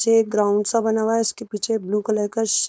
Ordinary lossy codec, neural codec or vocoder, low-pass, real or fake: none; codec, 16 kHz, 4.8 kbps, FACodec; none; fake